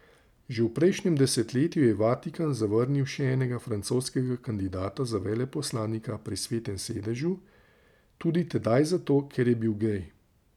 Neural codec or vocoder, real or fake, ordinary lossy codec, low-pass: none; real; none; 19.8 kHz